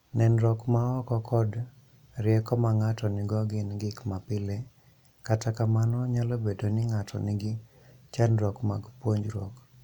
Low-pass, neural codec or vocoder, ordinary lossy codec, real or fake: 19.8 kHz; none; none; real